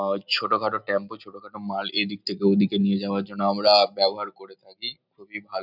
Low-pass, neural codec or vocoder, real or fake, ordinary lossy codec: 5.4 kHz; none; real; none